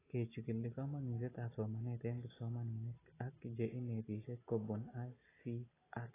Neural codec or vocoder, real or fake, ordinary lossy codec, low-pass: none; real; AAC, 16 kbps; 3.6 kHz